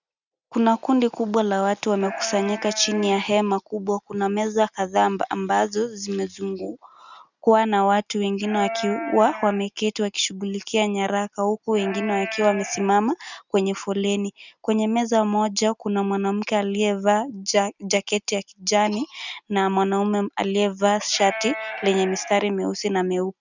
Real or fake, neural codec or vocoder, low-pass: real; none; 7.2 kHz